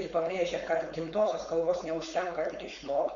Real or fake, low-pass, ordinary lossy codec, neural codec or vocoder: fake; 7.2 kHz; Opus, 64 kbps; codec, 16 kHz, 4.8 kbps, FACodec